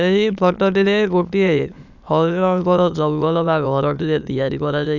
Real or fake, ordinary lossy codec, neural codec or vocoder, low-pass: fake; none; autoencoder, 22.05 kHz, a latent of 192 numbers a frame, VITS, trained on many speakers; 7.2 kHz